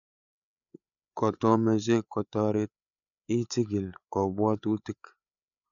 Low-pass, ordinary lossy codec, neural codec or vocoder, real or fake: 7.2 kHz; none; codec, 16 kHz, 8 kbps, FreqCodec, larger model; fake